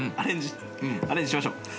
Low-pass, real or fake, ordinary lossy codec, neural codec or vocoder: none; real; none; none